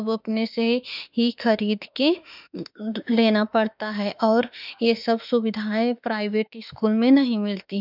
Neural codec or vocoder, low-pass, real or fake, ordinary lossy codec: autoencoder, 48 kHz, 32 numbers a frame, DAC-VAE, trained on Japanese speech; 5.4 kHz; fake; none